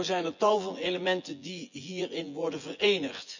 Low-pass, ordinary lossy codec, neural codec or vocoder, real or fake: 7.2 kHz; none; vocoder, 24 kHz, 100 mel bands, Vocos; fake